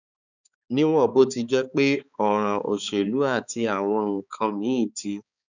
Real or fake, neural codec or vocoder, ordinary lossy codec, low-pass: fake; codec, 16 kHz, 4 kbps, X-Codec, HuBERT features, trained on balanced general audio; none; 7.2 kHz